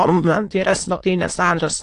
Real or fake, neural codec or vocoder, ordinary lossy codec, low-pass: fake; autoencoder, 22.05 kHz, a latent of 192 numbers a frame, VITS, trained on many speakers; AAC, 48 kbps; 9.9 kHz